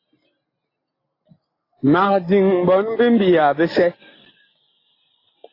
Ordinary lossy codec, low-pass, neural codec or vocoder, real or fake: AAC, 24 kbps; 5.4 kHz; none; real